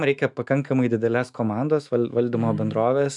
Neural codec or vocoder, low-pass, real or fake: autoencoder, 48 kHz, 128 numbers a frame, DAC-VAE, trained on Japanese speech; 10.8 kHz; fake